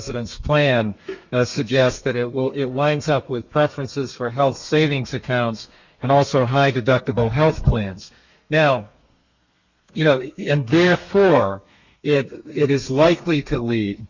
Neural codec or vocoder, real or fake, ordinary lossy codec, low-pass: codec, 32 kHz, 1.9 kbps, SNAC; fake; Opus, 64 kbps; 7.2 kHz